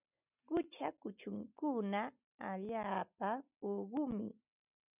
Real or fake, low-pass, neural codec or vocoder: real; 3.6 kHz; none